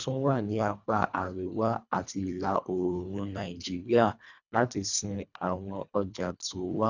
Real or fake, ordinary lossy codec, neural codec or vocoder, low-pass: fake; none; codec, 24 kHz, 1.5 kbps, HILCodec; 7.2 kHz